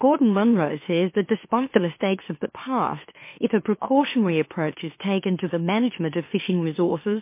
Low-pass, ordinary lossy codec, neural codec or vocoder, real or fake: 3.6 kHz; MP3, 24 kbps; autoencoder, 44.1 kHz, a latent of 192 numbers a frame, MeloTTS; fake